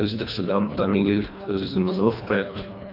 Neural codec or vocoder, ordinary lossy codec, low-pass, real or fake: codec, 24 kHz, 1.5 kbps, HILCodec; AAC, 48 kbps; 5.4 kHz; fake